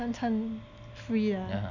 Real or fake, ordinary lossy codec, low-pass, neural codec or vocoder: real; none; 7.2 kHz; none